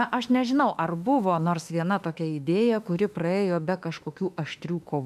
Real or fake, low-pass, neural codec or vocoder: fake; 14.4 kHz; autoencoder, 48 kHz, 32 numbers a frame, DAC-VAE, trained on Japanese speech